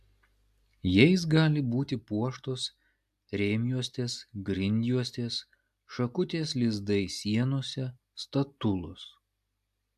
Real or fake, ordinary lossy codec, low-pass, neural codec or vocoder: real; AAC, 96 kbps; 14.4 kHz; none